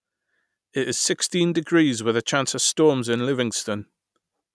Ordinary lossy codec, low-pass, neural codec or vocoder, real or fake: none; none; none; real